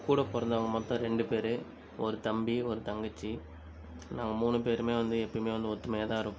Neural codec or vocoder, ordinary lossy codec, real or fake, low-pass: none; none; real; none